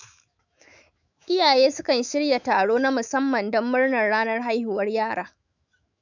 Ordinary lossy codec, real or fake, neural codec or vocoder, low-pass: none; fake; autoencoder, 48 kHz, 128 numbers a frame, DAC-VAE, trained on Japanese speech; 7.2 kHz